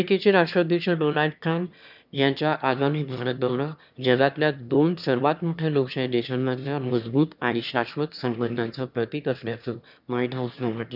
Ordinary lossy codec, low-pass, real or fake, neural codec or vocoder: none; 5.4 kHz; fake; autoencoder, 22.05 kHz, a latent of 192 numbers a frame, VITS, trained on one speaker